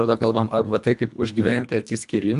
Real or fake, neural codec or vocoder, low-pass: fake; codec, 24 kHz, 1.5 kbps, HILCodec; 10.8 kHz